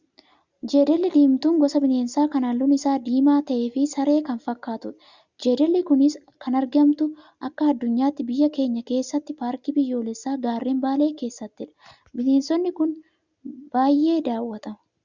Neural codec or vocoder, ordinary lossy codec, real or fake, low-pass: none; Opus, 64 kbps; real; 7.2 kHz